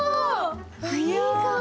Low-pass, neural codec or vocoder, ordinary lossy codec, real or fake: none; none; none; real